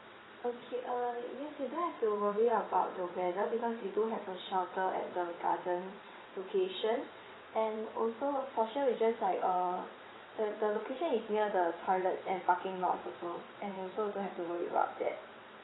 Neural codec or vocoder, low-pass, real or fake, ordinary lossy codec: vocoder, 22.05 kHz, 80 mel bands, WaveNeXt; 7.2 kHz; fake; AAC, 16 kbps